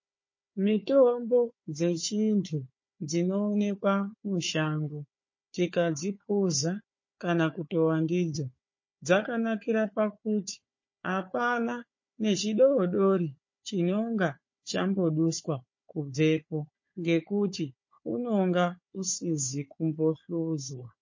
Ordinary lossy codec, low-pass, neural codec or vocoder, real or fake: MP3, 32 kbps; 7.2 kHz; codec, 16 kHz, 4 kbps, FunCodec, trained on Chinese and English, 50 frames a second; fake